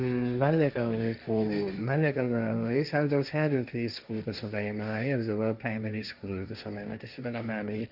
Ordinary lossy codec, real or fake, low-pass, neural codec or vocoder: none; fake; 5.4 kHz; codec, 16 kHz, 1.1 kbps, Voila-Tokenizer